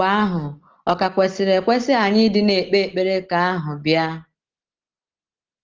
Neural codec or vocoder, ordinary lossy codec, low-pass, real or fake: none; Opus, 16 kbps; 7.2 kHz; real